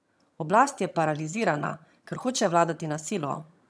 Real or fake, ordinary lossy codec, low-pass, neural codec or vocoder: fake; none; none; vocoder, 22.05 kHz, 80 mel bands, HiFi-GAN